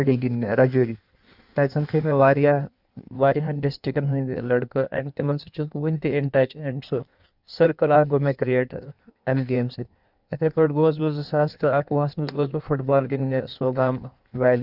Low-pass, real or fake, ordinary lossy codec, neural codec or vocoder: 5.4 kHz; fake; none; codec, 16 kHz in and 24 kHz out, 1.1 kbps, FireRedTTS-2 codec